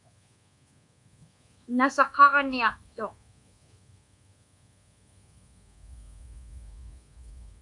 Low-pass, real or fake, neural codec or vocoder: 10.8 kHz; fake; codec, 24 kHz, 1.2 kbps, DualCodec